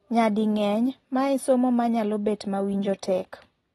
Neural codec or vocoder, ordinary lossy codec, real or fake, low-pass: none; AAC, 32 kbps; real; 19.8 kHz